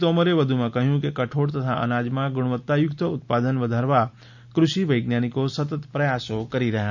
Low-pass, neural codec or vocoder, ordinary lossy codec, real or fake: 7.2 kHz; none; none; real